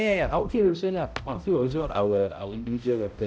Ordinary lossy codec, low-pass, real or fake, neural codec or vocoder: none; none; fake; codec, 16 kHz, 0.5 kbps, X-Codec, HuBERT features, trained on balanced general audio